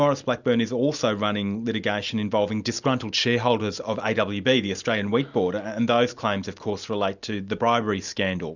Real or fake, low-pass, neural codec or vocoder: real; 7.2 kHz; none